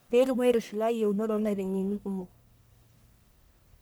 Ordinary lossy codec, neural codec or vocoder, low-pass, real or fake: none; codec, 44.1 kHz, 1.7 kbps, Pupu-Codec; none; fake